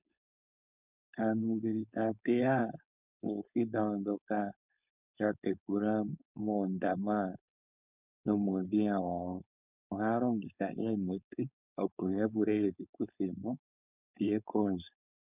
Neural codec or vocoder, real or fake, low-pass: codec, 16 kHz, 4.8 kbps, FACodec; fake; 3.6 kHz